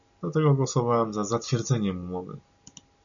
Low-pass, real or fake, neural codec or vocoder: 7.2 kHz; real; none